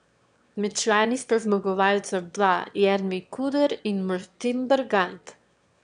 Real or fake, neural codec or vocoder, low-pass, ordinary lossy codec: fake; autoencoder, 22.05 kHz, a latent of 192 numbers a frame, VITS, trained on one speaker; 9.9 kHz; none